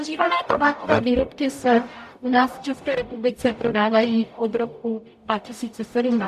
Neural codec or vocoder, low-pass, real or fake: codec, 44.1 kHz, 0.9 kbps, DAC; 14.4 kHz; fake